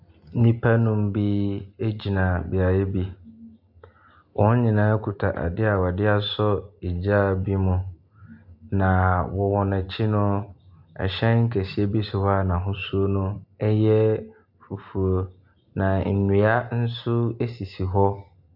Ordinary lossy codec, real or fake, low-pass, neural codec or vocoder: AAC, 48 kbps; real; 5.4 kHz; none